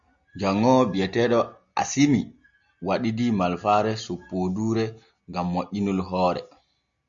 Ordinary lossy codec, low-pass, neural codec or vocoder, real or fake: Opus, 64 kbps; 7.2 kHz; none; real